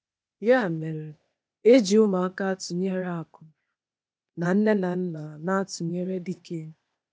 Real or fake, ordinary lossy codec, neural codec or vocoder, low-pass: fake; none; codec, 16 kHz, 0.8 kbps, ZipCodec; none